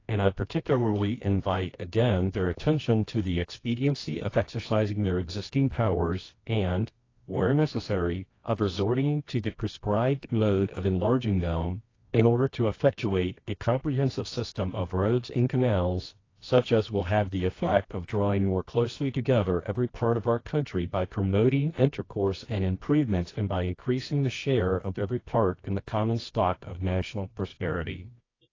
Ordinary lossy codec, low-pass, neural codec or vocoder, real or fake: AAC, 32 kbps; 7.2 kHz; codec, 24 kHz, 0.9 kbps, WavTokenizer, medium music audio release; fake